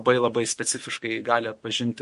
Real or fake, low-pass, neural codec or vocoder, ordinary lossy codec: fake; 14.4 kHz; autoencoder, 48 kHz, 128 numbers a frame, DAC-VAE, trained on Japanese speech; MP3, 48 kbps